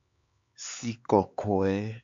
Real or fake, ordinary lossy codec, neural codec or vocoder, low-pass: fake; MP3, 48 kbps; codec, 16 kHz, 4 kbps, X-Codec, HuBERT features, trained on LibriSpeech; 7.2 kHz